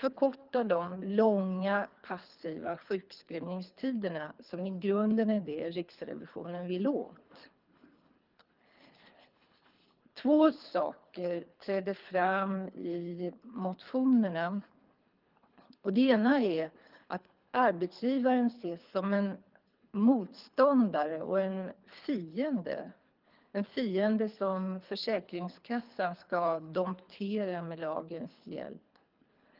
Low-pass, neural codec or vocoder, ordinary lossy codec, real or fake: 5.4 kHz; codec, 24 kHz, 3 kbps, HILCodec; Opus, 32 kbps; fake